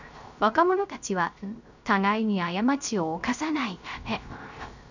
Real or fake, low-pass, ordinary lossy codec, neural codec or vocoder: fake; 7.2 kHz; none; codec, 16 kHz, 0.3 kbps, FocalCodec